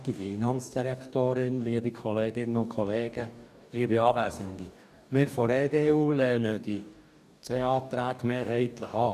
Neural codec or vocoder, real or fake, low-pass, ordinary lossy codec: codec, 44.1 kHz, 2.6 kbps, DAC; fake; 14.4 kHz; none